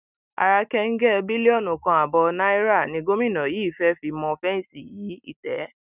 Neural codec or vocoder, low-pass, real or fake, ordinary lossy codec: none; 3.6 kHz; real; none